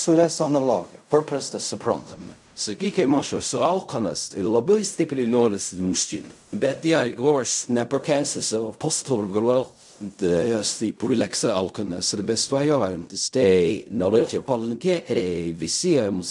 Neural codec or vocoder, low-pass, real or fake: codec, 16 kHz in and 24 kHz out, 0.4 kbps, LongCat-Audio-Codec, fine tuned four codebook decoder; 10.8 kHz; fake